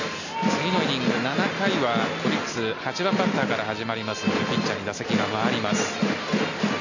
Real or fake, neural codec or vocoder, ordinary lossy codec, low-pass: real; none; none; 7.2 kHz